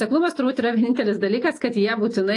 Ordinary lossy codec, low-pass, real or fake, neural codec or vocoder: AAC, 48 kbps; 10.8 kHz; real; none